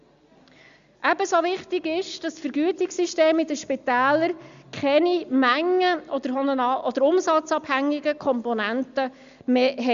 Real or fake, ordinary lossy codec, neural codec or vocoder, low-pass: real; Opus, 64 kbps; none; 7.2 kHz